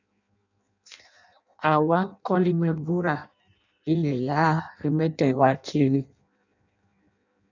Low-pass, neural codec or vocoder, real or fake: 7.2 kHz; codec, 16 kHz in and 24 kHz out, 0.6 kbps, FireRedTTS-2 codec; fake